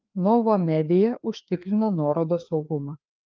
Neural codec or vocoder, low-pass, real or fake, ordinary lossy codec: codec, 16 kHz, 2 kbps, FreqCodec, larger model; 7.2 kHz; fake; Opus, 32 kbps